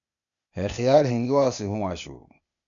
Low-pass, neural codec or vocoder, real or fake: 7.2 kHz; codec, 16 kHz, 0.8 kbps, ZipCodec; fake